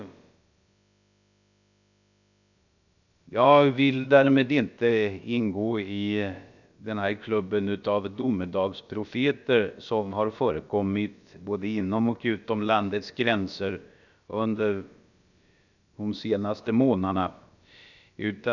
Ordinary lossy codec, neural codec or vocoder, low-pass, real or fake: none; codec, 16 kHz, about 1 kbps, DyCAST, with the encoder's durations; 7.2 kHz; fake